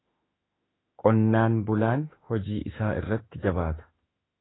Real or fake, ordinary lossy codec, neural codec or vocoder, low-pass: fake; AAC, 16 kbps; autoencoder, 48 kHz, 32 numbers a frame, DAC-VAE, trained on Japanese speech; 7.2 kHz